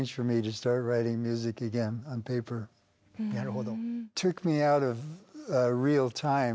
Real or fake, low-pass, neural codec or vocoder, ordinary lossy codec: real; none; none; none